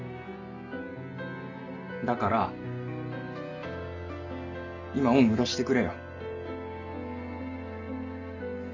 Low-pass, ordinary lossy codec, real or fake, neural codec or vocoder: 7.2 kHz; none; real; none